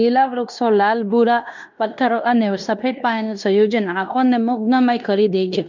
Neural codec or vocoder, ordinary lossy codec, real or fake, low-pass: codec, 16 kHz in and 24 kHz out, 0.9 kbps, LongCat-Audio-Codec, fine tuned four codebook decoder; none; fake; 7.2 kHz